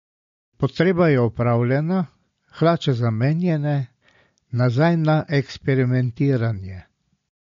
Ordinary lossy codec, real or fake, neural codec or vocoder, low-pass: MP3, 48 kbps; real; none; 7.2 kHz